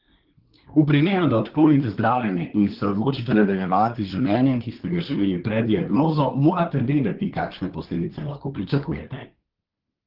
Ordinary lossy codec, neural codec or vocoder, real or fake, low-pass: Opus, 16 kbps; codec, 24 kHz, 1 kbps, SNAC; fake; 5.4 kHz